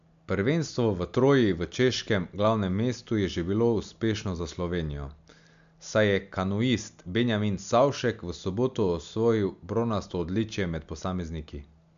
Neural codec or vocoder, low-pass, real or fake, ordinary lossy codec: none; 7.2 kHz; real; MP3, 64 kbps